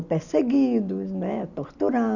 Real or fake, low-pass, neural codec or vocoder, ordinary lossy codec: real; 7.2 kHz; none; none